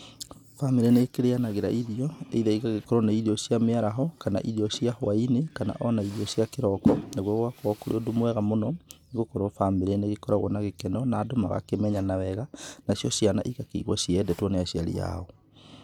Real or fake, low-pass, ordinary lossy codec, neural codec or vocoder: real; 19.8 kHz; none; none